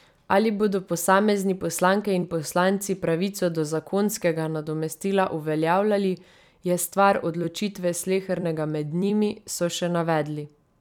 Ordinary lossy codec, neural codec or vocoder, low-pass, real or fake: none; vocoder, 44.1 kHz, 128 mel bands every 256 samples, BigVGAN v2; 19.8 kHz; fake